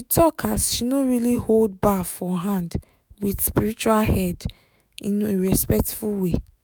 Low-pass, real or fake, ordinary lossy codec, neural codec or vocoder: none; fake; none; autoencoder, 48 kHz, 128 numbers a frame, DAC-VAE, trained on Japanese speech